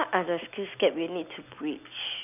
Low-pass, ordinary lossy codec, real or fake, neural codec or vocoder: 3.6 kHz; none; real; none